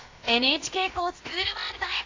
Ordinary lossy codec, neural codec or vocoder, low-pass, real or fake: AAC, 32 kbps; codec, 16 kHz, about 1 kbps, DyCAST, with the encoder's durations; 7.2 kHz; fake